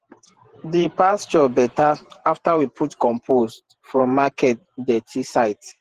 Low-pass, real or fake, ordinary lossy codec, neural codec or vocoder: 14.4 kHz; fake; Opus, 16 kbps; vocoder, 48 kHz, 128 mel bands, Vocos